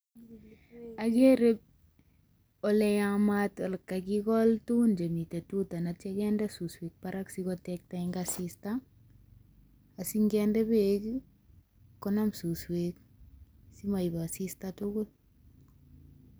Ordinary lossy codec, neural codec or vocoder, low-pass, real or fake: none; none; none; real